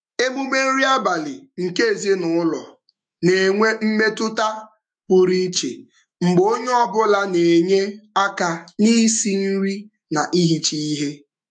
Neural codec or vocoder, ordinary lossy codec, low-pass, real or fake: autoencoder, 48 kHz, 128 numbers a frame, DAC-VAE, trained on Japanese speech; MP3, 64 kbps; 9.9 kHz; fake